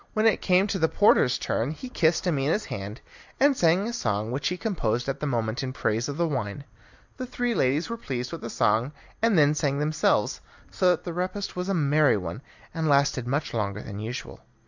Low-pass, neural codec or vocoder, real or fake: 7.2 kHz; none; real